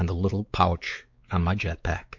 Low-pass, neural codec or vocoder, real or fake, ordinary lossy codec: 7.2 kHz; codec, 16 kHz, 4 kbps, X-Codec, WavLM features, trained on Multilingual LibriSpeech; fake; MP3, 48 kbps